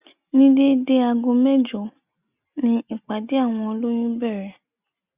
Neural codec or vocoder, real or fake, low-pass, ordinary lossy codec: none; real; 3.6 kHz; Opus, 64 kbps